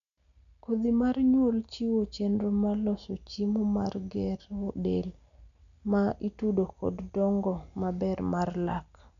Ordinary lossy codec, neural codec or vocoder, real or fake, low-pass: none; none; real; 7.2 kHz